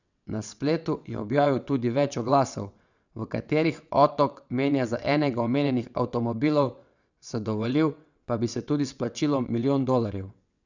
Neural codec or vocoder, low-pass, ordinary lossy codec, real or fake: vocoder, 22.05 kHz, 80 mel bands, WaveNeXt; 7.2 kHz; none; fake